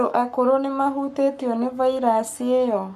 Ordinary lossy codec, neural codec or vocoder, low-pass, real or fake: none; codec, 44.1 kHz, 7.8 kbps, Pupu-Codec; 14.4 kHz; fake